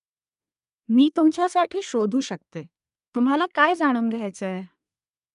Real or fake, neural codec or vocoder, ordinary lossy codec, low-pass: fake; codec, 24 kHz, 1 kbps, SNAC; none; 10.8 kHz